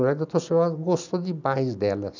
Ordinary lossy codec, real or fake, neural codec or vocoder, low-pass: none; real; none; 7.2 kHz